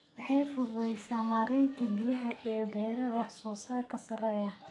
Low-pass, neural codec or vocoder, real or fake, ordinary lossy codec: 10.8 kHz; codec, 44.1 kHz, 2.6 kbps, SNAC; fake; AAC, 64 kbps